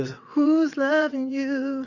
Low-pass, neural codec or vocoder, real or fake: 7.2 kHz; vocoder, 22.05 kHz, 80 mel bands, WaveNeXt; fake